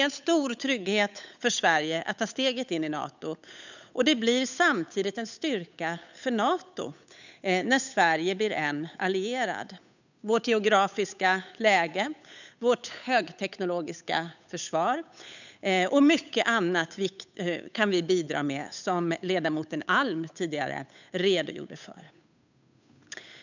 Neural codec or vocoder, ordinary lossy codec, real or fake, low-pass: codec, 16 kHz, 8 kbps, FunCodec, trained on Chinese and English, 25 frames a second; none; fake; 7.2 kHz